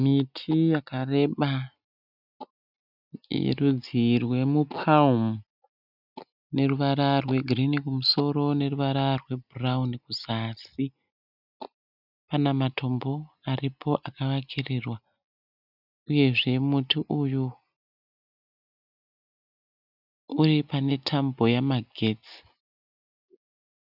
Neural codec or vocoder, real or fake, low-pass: none; real; 5.4 kHz